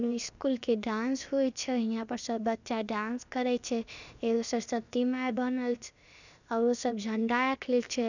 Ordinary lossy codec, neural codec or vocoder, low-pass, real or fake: none; codec, 16 kHz, about 1 kbps, DyCAST, with the encoder's durations; 7.2 kHz; fake